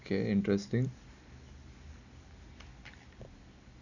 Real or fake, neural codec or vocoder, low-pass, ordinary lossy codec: real; none; 7.2 kHz; none